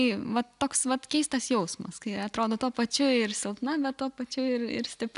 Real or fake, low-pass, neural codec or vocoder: real; 10.8 kHz; none